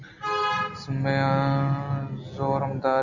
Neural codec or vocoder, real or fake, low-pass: none; real; 7.2 kHz